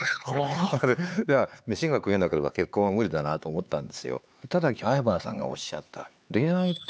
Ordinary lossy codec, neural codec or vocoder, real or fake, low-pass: none; codec, 16 kHz, 4 kbps, X-Codec, HuBERT features, trained on LibriSpeech; fake; none